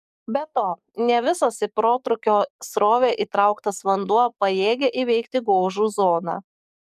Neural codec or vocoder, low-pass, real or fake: codec, 44.1 kHz, 7.8 kbps, DAC; 14.4 kHz; fake